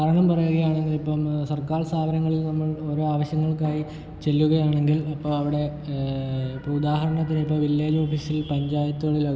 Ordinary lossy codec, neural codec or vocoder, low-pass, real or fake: none; none; none; real